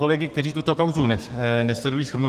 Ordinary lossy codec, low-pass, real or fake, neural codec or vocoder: Opus, 24 kbps; 14.4 kHz; fake; codec, 32 kHz, 1.9 kbps, SNAC